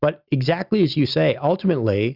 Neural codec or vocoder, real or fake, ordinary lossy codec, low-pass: none; real; Opus, 64 kbps; 5.4 kHz